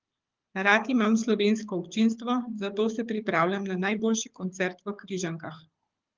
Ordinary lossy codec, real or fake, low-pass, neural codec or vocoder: Opus, 24 kbps; fake; 7.2 kHz; codec, 24 kHz, 6 kbps, HILCodec